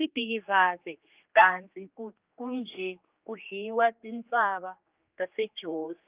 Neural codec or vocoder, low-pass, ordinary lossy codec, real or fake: codec, 16 kHz, 2 kbps, X-Codec, HuBERT features, trained on general audio; 3.6 kHz; Opus, 24 kbps; fake